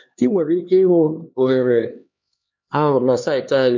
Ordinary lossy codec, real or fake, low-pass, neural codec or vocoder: MP3, 48 kbps; fake; 7.2 kHz; codec, 16 kHz, 2 kbps, X-Codec, HuBERT features, trained on LibriSpeech